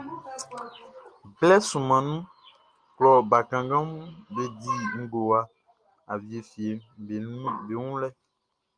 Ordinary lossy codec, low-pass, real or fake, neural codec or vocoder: Opus, 32 kbps; 9.9 kHz; real; none